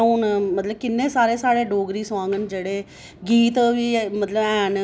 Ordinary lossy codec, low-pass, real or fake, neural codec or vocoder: none; none; real; none